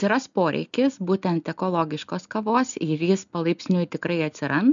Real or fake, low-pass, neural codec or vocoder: real; 7.2 kHz; none